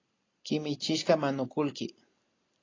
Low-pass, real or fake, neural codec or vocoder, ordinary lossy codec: 7.2 kHz; real; none; AAC, 32 kbps